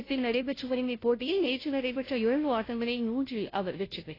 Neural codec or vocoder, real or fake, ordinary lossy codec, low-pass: codec, 16 kHz, 0.5 kbps, FunCodec, trained on Chinese and English, 25 frames a second; fake; AAC, 24 kbps; 5.4 kHz